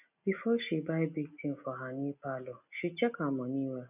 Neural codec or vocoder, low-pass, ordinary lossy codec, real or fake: none; 3.6 kHz; none; real